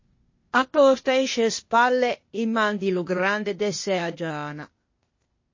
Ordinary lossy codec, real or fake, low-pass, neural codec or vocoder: MP3, 32 kbps; fake; 7.2 kHz; codec, 16 kHz, 0.8 kbps, ZipCodec